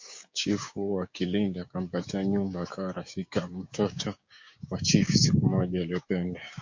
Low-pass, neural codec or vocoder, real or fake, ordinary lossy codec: 7.2 kHz; codec, 16 kHz, 6 kbps, DAC; fake; MP3, 48 kbps